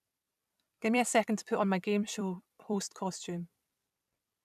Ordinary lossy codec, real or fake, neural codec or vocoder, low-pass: none; fake; vocoder, 44.1 kHz, 128 mel bands every 256 samples, BigVGAN v2; 14.4 kHz